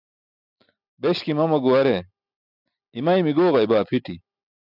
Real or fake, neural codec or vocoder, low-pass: real; none; 5.4 kHz